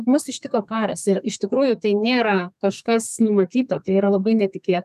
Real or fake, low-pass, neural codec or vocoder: fake; 14.4 kHz; codec, 32 kHz, 1.9 kbps, SNAC